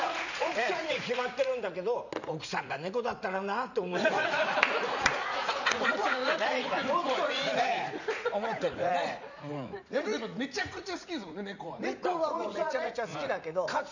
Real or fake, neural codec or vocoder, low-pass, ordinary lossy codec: fake; vocoder, 44.1 kHz, 80 mel bands, Vocos; 7.2 kHz; none